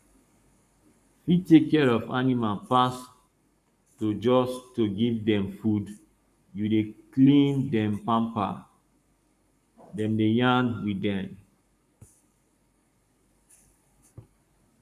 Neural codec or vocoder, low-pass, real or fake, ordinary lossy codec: codec, 44.1 kHz, 7.8 kbps, Pupu-Codec; 14.4 kHz; fake; none